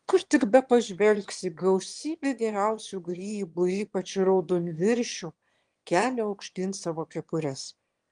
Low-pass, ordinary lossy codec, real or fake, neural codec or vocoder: 9.9 kHz; Opus, 24 kbps; fake; autoencoder, 22.05 kHz, a latent of 192 numbers a frame, VITS, trained on one speaker